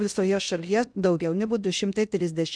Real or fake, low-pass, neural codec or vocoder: fake; 9.9 kHz; codec, 16 kHz in and 24 kHz out, 0.6 kbps, FocalCodec, streaming, 2048 codes